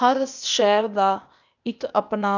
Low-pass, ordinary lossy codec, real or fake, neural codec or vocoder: 7.2 kHz; none; fake; codec, 16 kHz, 0.8 kbps, ZipCodec